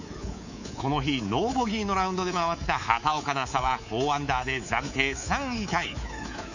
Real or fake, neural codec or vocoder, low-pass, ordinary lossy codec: fake; codec, 24 kHz, 3.1 kbps, DualCodec; 7.2 kHz; none